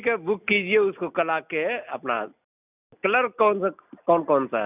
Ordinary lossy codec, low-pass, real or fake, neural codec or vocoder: none; 3.6 kHz; real; none